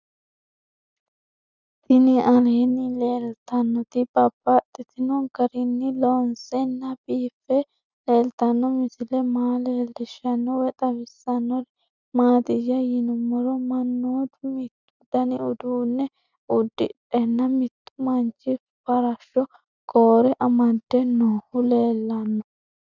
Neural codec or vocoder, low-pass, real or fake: none; 7.2 kHz; real